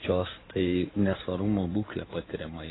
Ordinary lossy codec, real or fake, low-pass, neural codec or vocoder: AAC, 16 kbps; fake; 7.2 kHz; codec, 16 kHz in and 24 kHz out, 2.2 kbps, FireRedTTS-2 codec